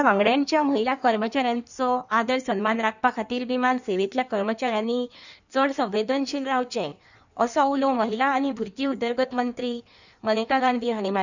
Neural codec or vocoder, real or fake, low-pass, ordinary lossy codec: codec, 16 kHz in and 24 kHz out, 1.1 kbps, FireRedTTS-2 codec; fake; 7.2 kHz; none